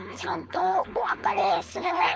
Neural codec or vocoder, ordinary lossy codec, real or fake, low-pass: codec, 16 kHz, 4.8 kbps, FACodec; none; fake; none